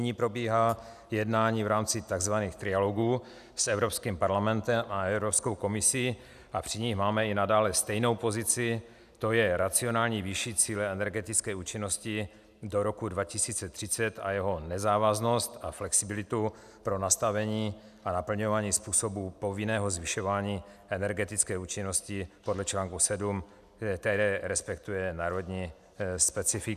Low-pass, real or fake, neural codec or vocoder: 14.4 kHz; real; none